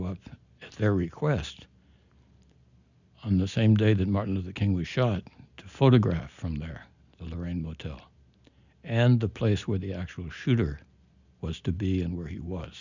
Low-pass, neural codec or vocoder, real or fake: 7.2 kHz; none; real